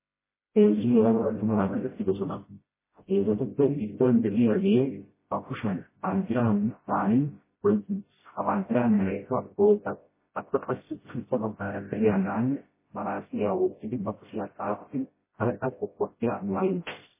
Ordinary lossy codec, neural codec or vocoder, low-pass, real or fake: MP3, 16 kbps; codec, 16 kHz, 0.5 kbps, FreqCodec, smaller model; 3.6 kHz; fake